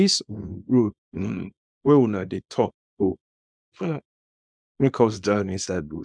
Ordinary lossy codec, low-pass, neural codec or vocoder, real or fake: none; 9.9 kHz; codec, 24 kHz, 0.9 kbps, WavTokenizer, small release; fake